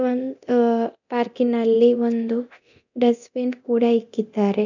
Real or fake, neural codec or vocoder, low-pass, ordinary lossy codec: fake; codec, 24 kHz, 0.9 kbps, DualCodec; 7.2 kHz; none